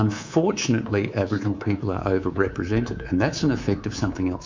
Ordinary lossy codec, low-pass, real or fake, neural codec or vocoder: MP3, 64 kbps; 7.2 kHz; fake; codec, 24 kHz, 3.1 kbps, DualCodec